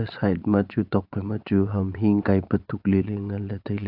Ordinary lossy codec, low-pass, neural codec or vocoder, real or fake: AAC, 48 kbps; 5.4 kHz; vocoder, 22.05 kHz, 80 mel bands, Vocos; fake